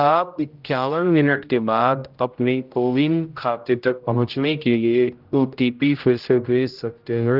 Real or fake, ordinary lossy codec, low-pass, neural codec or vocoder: fake; Opus, 32 kbps; 5.4 kHz; codec, 16 kHz, 0.5 kbps, X-Codec, HuBERT features, trained on general audio